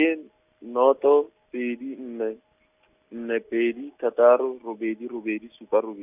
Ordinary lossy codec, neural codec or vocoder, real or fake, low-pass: none; none; real; 3.6 kHz